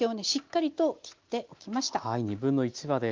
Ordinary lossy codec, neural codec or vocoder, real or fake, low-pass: Opus, 32 kbps; none; real; 7.2 kHz